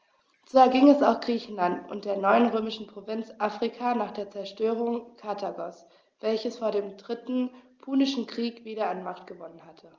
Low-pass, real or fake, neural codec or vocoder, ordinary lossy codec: 7.2 kHz; real; none; Opus, 24 kbps